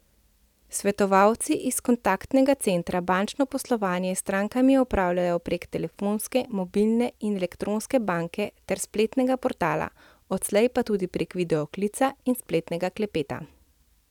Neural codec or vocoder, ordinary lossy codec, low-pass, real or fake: none; none; 19.8 kHz; real